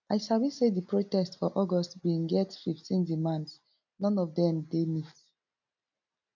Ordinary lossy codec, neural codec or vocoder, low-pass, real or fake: none; none; 7.2 kHz; real